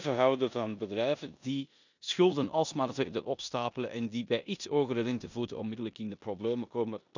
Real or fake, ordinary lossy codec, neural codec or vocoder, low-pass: fake; none; codec, 16 kHz in and 24 kHz out, 0.9 kbps, LongCat-Audio-Codec, four codebook decoder; 7.2 kHz